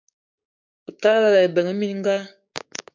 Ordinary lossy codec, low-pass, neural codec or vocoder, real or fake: MP3, 64 kbps; 7.2 kHz; codec, 16 kHz, 6 kbps, DAC; fake